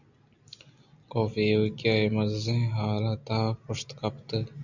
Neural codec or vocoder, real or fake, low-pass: none; real; 7.2 kHz